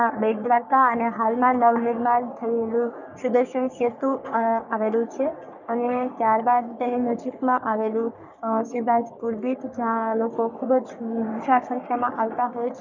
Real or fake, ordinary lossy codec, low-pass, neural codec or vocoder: fake; none; 7.2 kHz; codec, 44.1 kHz, 3.4 kbps, Pupu-Codec